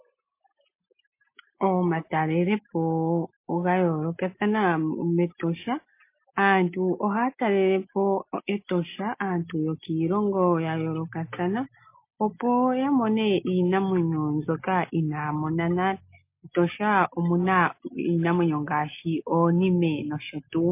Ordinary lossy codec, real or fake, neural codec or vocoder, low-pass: MP3, 24 kbps; real; none; 3.6 kHz